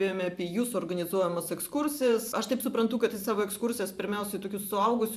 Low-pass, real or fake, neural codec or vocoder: 14.4 kHz; fake; vocoder, 44.1 kHz, 128 mel bands every 512 samples, BigVGAN v2